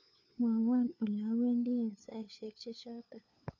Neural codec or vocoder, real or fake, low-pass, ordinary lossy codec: codec, 16 kHz, 4 kbps, FunCodec, trained on LibriTTS, 50 frames a second; fake; 7.2 kHz; none